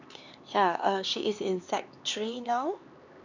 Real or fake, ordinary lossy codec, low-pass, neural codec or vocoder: fake; none; 7.2 kHz; codec, 16 kHz, 4 kbps, X-Codec, HuBERT features, trained on LibriSpeech